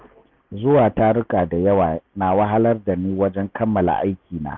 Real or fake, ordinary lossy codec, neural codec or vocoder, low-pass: real; none; none; 7.2 kHz